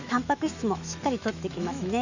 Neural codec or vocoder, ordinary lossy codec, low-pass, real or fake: autoencoder, 48 kHz, 128 numbers a frame, DAC-VAE, trained on Japanese speech; none; 7.2 kHz; fake